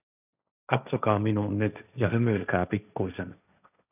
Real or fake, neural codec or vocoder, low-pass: fake; codec, 16 kHz, 1.1 kbps, Voila-Tokenizer; 3.6 kHz